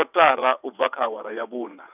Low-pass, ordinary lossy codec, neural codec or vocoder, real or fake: 3.6 kHz; none; vocoder, 22.05 kHz, 80 mel bands, WaveNeXt; fake